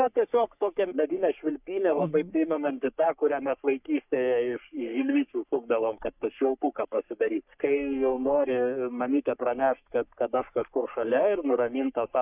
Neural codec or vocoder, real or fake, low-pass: codec, 44.1 kHz, 3.4 kbps, Pupu-Codec; fake; 3.6 kHz